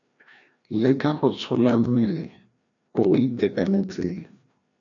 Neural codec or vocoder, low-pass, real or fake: codec, 16 kHz, 1 kbps, FreqCodec, larger model; 7.2 kHz; fake